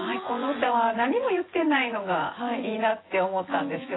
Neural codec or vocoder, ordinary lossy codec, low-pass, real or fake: vocoder, 24 kHz, 100 mel bands, Vocos; AAC, 16 kbps; 7.2 kHz; fake